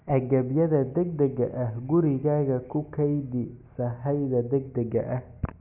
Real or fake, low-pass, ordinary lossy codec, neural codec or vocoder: real; 3.6 kHz; none; none